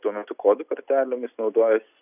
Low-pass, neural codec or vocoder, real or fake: 3.6 kHz; none; real